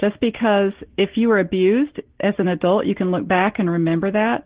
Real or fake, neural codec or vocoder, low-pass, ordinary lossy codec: real; none; 3.6 kHz; Opus, 16 kbps